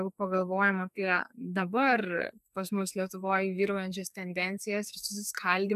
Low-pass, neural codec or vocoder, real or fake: 14.4 kHz; codec, 44.1 kHz, 2.6 kbps, SNAC; fake